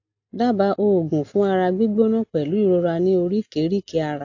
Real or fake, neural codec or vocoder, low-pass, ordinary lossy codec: real; none; 7.2 kHz; AAC, 48 kbps